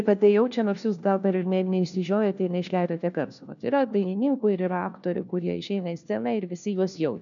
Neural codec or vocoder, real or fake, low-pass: codec, 16 kHz, 1 kbps, FunCodec, trained on LibriTTS, 50 frames a second; fake; 7.2 kHz